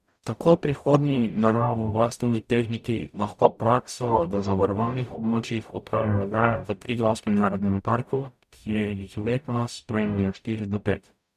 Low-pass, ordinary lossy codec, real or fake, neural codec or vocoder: 14.4 kHz; none; fake; codec, 44.1 kHz, 0.9 kbps, DAC